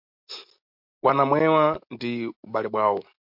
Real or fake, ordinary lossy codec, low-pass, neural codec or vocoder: real; MP3, 48 kbps; 5.4 kHz; none